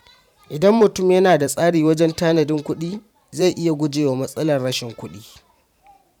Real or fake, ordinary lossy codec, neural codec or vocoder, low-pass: fake; none; vocoder, 44.1 kHz, 128 mel bands every 512 samples, BigVGAN v2; 19.8 kHz